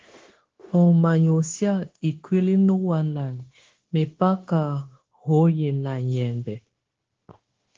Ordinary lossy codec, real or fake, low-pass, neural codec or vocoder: Opus, 16 kbps; fake; 7.2 kHz; codec, 16 kHz, 0.9 kbps, LongCat-Audio-Codec